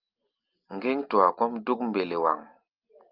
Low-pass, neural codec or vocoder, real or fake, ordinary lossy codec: 5.4 kHz; none; real; Opus, 24 kbps